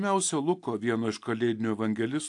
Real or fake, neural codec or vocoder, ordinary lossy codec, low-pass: real; none; AAC, 64 kbps; 10.8 kHz